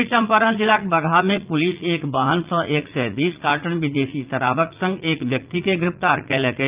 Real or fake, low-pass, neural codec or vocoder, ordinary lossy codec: fake; 3.6 kHz; vocoder, 44.1 kHz, 80 mel bands, Vocos; Opus, 32 kbps